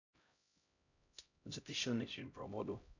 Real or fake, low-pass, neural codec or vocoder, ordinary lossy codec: fake; 7.2 kHz; codec, 16 kHz, 0.5 kbps, X-Codec, HuBERT features, trained on LibriSpeech; none